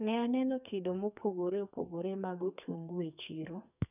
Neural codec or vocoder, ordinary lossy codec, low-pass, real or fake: codec, 44.1 kHz, 2.6 kbps, SNAC; none; 3.6 kHz; fake